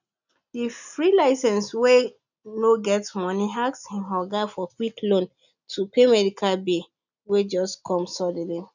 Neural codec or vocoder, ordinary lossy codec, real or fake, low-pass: none; none; real; 7.2 kHz